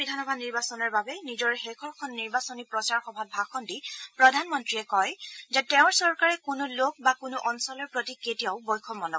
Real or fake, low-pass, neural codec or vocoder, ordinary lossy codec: real; none; none; none